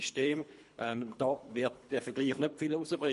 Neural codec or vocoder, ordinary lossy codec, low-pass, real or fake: codec, 24 kHz, 3 kbps, HILCodec; MP3, 48 kbps; 10.8 kHz; fake